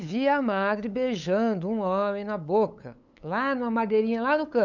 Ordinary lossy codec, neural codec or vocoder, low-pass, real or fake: none; codec, 16 kHz, 8 kbps, FunCodec, trained on LibriTTS, 25 frames a second; 7.2 kHz; fake